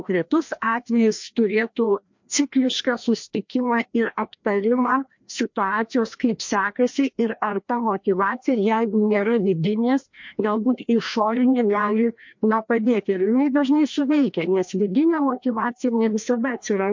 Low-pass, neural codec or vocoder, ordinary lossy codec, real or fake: 7.2 kHz; codec, 16 kHz, 1 kbps, FreqCodec, larger model; MP3, 48 kbps; fake